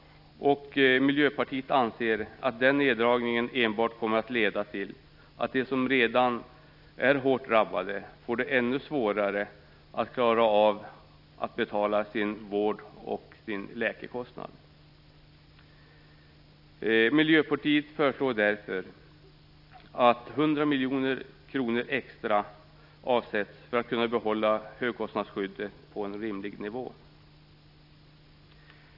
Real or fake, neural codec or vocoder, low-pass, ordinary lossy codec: real; none; 5.4 kHz; none